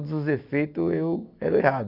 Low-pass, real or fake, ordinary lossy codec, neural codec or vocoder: 5.4 kHz; real; none; none